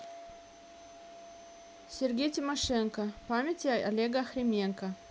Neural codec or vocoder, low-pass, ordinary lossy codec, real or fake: none; none; none; real